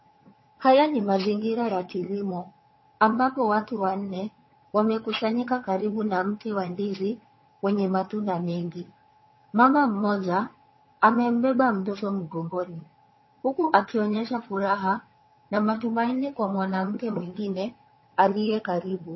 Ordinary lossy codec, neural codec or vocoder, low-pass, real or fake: MP3, 24 kbps; vocoder, 22.05 kHz, 80 mel bands, HiFi-GAN; 7.2 kHz; fake